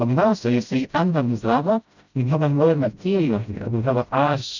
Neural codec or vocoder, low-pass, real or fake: codec, 16 kHz, 0.5 kbps, FreqCodec, smaller model; 7.2 kHz; fake